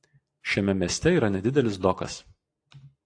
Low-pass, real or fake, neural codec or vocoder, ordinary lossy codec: 9.9 kHz; real; none; AAC, 48 kbps